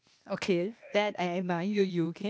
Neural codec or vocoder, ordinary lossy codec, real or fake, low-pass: codec, 16 kHz, 0.8 kbps, ZipCodec; none; fake; none